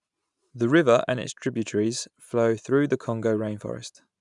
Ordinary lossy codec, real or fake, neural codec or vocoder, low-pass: none; fake; vocoder, 44.1 kHz, 128 mel bands every 512 samples, BigVGAN v2; 10.8 kHz